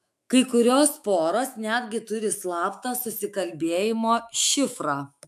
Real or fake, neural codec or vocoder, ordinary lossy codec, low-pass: fake; autoencoder, 48 kHz, 128 numbers a frame, DAC-VAE, trained on Japanese speech; AAC, 96 kbps; 14.4 kHz